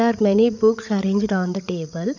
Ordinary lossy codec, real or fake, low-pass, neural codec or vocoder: none; fake; 7.2 kHz; codec, 16 kHz, 8 kbps, FreqCodec, larger model